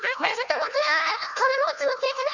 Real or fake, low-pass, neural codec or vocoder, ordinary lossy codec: fake; 7.2 kHz; codec, 16 kHz, 1 kbps, FunCodec, trained on Chinese and English, 50 frames a second; none